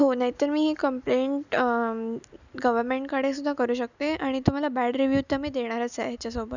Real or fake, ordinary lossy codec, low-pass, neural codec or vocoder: real; none; 7.2 kHz; none